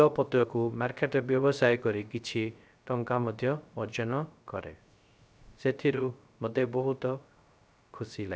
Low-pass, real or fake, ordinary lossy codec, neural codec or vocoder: none; fake; none; codec, 16 kHz, 0.3 kbps, FocalCodec